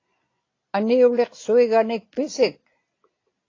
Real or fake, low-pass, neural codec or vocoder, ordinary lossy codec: real; 7.2 kHz; none; AAC, 32 kbps